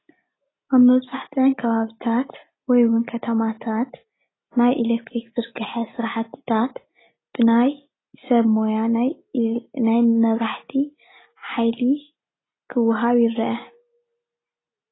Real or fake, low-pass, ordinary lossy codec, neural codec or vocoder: real; 7.2 kHz; AAC, 16 kbps; none